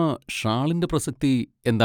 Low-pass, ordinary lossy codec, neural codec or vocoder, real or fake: 19.8 kHz; none; none; real